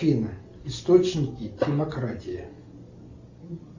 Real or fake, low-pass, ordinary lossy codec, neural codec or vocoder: real; 7.2 kHz; Opus, 64 kbps; none